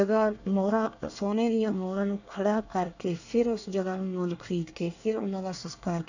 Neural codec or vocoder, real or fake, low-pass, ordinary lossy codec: codec, 24 kHz, 1 kbps, SNAC; fake; 7.2 kHz; none